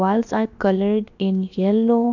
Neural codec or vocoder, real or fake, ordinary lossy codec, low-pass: codec, 16 kHz, about 1 kbps, DyCAST, with the encoder's durations; fake; none; 7.2 kHz